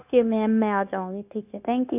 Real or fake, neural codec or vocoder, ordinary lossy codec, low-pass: fake; codec, 16 kHz, 0.9 kbps, LongCat-Audio-Codec; none; 3.6 kHz